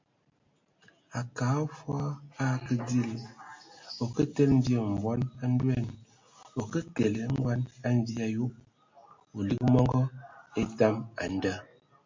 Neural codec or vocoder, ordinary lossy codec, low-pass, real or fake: none; MP3, 48 kbps; 7.2 kHz; real